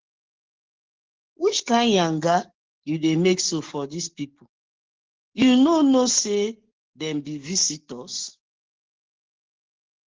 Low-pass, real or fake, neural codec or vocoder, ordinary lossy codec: 7.2 kHz; fake; codec, 44.1 kHz, 7.8 kbps, DAC; Opus, 16 kbps